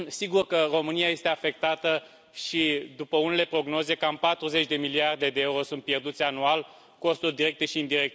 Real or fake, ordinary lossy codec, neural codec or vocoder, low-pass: real; none; none; none